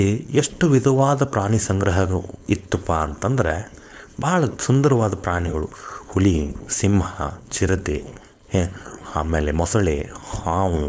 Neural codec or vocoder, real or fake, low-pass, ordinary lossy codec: codec, 16 kHz, 4.8 kbps, FACodec; fake; none; none